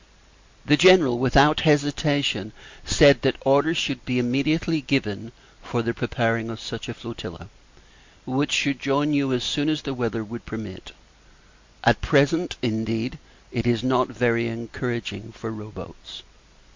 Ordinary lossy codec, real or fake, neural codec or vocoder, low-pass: MP3, 48 kbps; real; none; 7.2 kHz